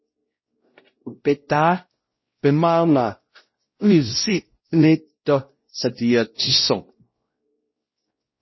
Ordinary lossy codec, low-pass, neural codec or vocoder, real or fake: MP3, 24 kbps; 7.2 kHz; codec, 16 kHz, 0.5 kbps, X-Codec, WavLM features, trained on Multilingual LibriSpeech; fake